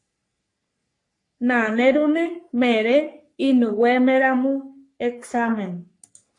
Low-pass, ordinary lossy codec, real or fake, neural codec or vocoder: 10.8 kHz; AAC, 48 kbps; fake; codec, 44.1 kHz, 3.4 kbps, Pupu-Codec